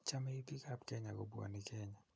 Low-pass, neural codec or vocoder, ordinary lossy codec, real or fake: none; none; none; real